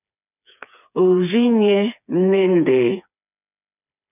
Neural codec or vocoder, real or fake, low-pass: codec, 16 kHz, 4 kbps, FreqCodec, smaller model; fake; 3.6 kHz